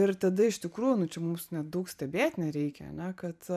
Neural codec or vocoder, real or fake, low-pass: vocoder, 44.1 kHz, 128 mel bands every 512 samples, BigVGAN v2; fake; 14.4 kHz